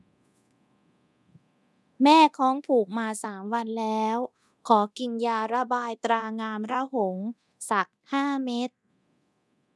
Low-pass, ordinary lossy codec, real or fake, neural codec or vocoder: none; none; fake; codec, 24 kHz, 0.9 kbps, DualCodec